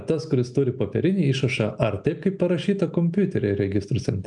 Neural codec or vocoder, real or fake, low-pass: none; real; 10.8 kHz